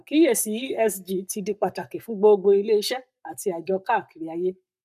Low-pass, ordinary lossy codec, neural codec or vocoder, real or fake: 14.4 kHz; none; codec, 44.1 kHz, 7.8 kbps, Pupu-Codec; fake